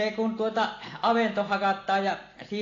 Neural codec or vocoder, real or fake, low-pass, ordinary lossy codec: none; real; 7.2 kHz; AAC, 32 kbps